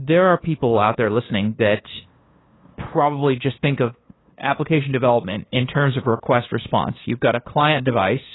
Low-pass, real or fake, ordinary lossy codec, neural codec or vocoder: 7.2 kHz; fake; AAC, 16 kbps; codec, 16 kHz, 2 kbps, FunCodec, trained on LibriTTS, 25 frames a second